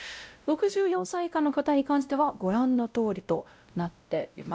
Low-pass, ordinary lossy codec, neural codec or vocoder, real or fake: none; none; codec, 16 kHz, 0.5 kbps, X-Codec, WavLM features, trained on Multilingual LibriSpeech; fake